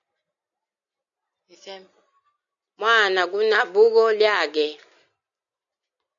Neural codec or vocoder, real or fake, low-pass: none; real; 7.2 kHz